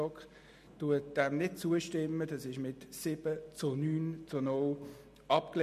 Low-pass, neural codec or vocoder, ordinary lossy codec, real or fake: 14.4 kHz; none; MP3, 64 kbps; real